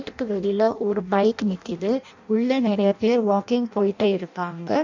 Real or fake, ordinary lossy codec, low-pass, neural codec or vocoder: fake; none; 7.2 kHz; codec, 16 kHz in and 24 kHz out, 0.6 kbps, FireRedTTS-2 codec